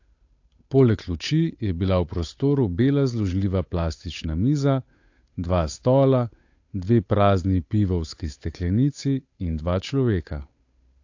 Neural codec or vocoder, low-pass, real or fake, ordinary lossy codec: codec, 16 kHz, 8 kbps, FunCodec, trained on Chinese and English, 25 frames a second; 7.2 kHz; fake; AAC, 48 kbps